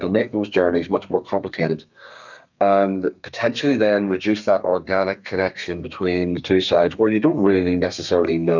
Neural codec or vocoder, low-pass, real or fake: codec, 32 kHz, 1.9 kbps, SNAC; 7.2 kHz; fake